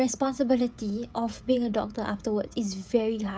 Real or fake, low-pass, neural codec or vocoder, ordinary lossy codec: fake; none; codec, 16 kHz, 16 kbps, FreqCodec, smaller model; none